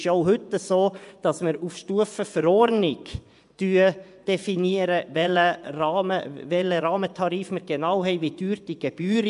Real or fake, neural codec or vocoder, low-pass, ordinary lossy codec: fake; vocoder, 24 kHz, 100 mel bands, Vocos; 10.8 kHz; none